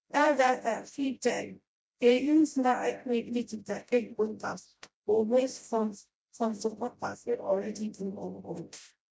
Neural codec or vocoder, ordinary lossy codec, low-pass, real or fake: codec, 16 kHz, 0.5 kbps, FreqCodec, smaller model; none; none; fake